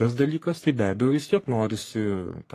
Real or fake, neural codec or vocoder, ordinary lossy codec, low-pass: fake; codec, 44.1 kHz, 3.4 kbps, Pupu-Codec; AAC, 48 kbps; 14.4 kHz